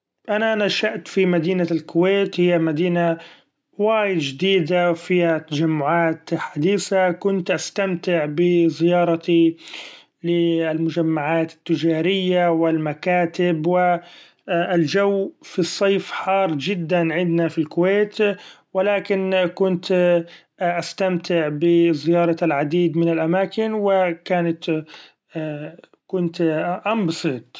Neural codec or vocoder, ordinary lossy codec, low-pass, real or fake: none; none; none; real